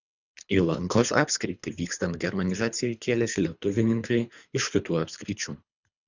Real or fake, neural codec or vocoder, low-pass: fake; codec, 24 kHz, 3 kbps, HILCodec; 7.2 kHz